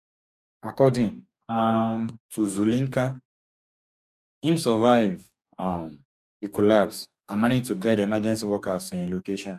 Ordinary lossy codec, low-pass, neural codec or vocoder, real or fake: none; 14.4 kHz; codec, 44.1 kHz, 2.6 kbps, DAC; fake